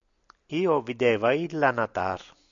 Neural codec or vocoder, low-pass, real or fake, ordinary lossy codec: none; 7.2 kHz; real; MP3, 64 kbps